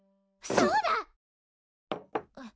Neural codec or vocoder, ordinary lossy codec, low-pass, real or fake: none; none; none; real